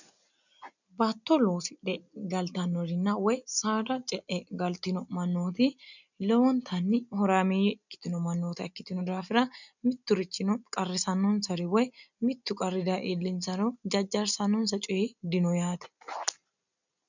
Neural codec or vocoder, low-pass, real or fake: none; 7.2 kHz; real